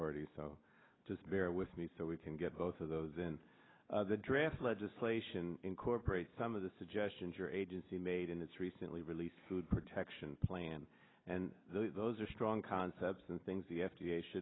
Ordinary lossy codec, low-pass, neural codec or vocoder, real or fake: AAC, 16 kbps; 7.2 kHz; none; real